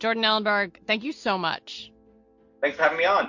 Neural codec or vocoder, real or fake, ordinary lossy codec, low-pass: none; real; MP3, 48 kbps; 7.2 kHz